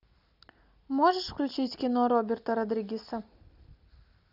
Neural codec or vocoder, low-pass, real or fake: none; 5.4 kHz; real